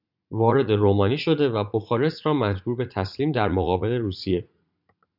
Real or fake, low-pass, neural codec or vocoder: fake; 5.4 kHz; vocoder, 44.1 kHz, 80 mel bands, Vocos